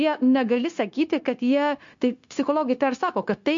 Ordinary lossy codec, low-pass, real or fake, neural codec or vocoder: MP3, 48 kbps; 7.2 kHz; fake; codec, 16 kHz, 0.9 kbps, LongCat-Audio-Codec